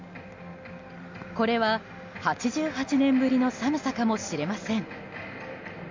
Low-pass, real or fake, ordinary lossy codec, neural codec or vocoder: 7.2 kHz; real; MP3, 48 kbps; none